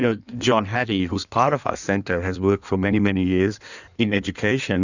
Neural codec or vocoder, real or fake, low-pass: codec, 16 kHz in and 24 kHz out, 1.1 kbps, FireRedTTS-2 codec; fake; 7.2 kHz